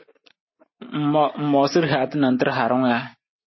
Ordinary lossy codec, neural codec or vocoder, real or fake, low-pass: MP3, 24 kbps; none; real; 7.2 kHz